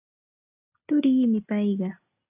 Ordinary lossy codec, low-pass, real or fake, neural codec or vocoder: AAC, 32 kbps; 3.6 kHz; real; none